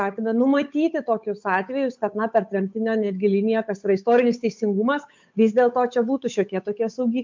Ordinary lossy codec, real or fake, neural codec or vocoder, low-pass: MP3, 64 kbps; fake; codec, 16 kHz, 8 kbps, FunCodec, trained on Chinese and English, 25 frames a second; 7.2 kHz